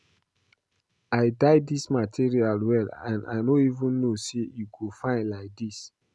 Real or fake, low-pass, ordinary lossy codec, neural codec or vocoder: real; none; none; none